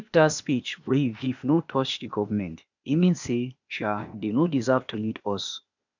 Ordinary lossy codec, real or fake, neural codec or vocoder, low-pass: none; fake; codec, 16 kHz, 0.8 kbps, ZipCodec; 7.2 kHz